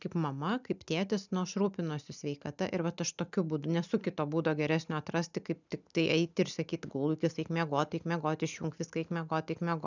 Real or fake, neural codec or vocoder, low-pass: real; none; 7.2 kHz